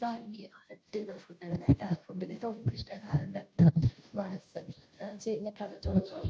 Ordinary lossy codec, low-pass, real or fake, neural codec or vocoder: none; none; fake; codec, 16 kHz, 0.5 kbps, FunCodec, trained on Chinese and English, 25 frames a second